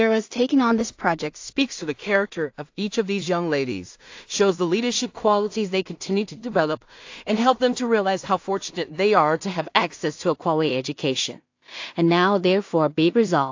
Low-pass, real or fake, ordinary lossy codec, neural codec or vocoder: 7.2 kHz; fake; AAC, 48 kbps; codec, 16 kHz in and 24 kHz out, 0.4 kbps, LongCat-Audio-Codec, two codebook decoder